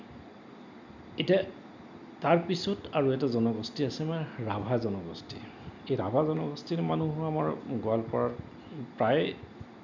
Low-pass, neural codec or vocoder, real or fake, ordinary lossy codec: 7.2 kHz; none; real; none